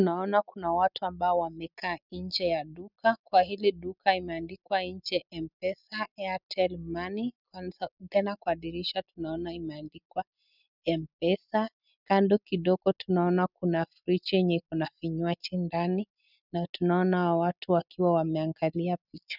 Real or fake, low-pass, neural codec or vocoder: real; 5.4 kHz; none